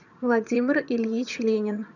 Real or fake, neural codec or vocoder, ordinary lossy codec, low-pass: fake; vocoder, 22.05 kHz, 80 mel bands, HiFi-GAN; none; 7.2 kHz